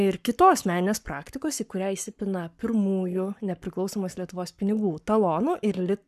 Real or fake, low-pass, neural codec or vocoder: fake; 14.4 kHz; codec, 44.1 kHz, 7.8 kbps, Pupu-Codec